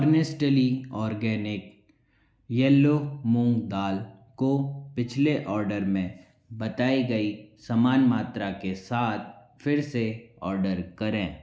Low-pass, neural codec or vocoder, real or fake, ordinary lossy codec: none; none; real; none